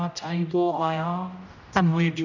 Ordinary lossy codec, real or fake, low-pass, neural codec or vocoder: none; fake; 7.2 kHz; codec, 16 kHz, 0.5 kbps, X-Codec, HuBERT features, trained on general audio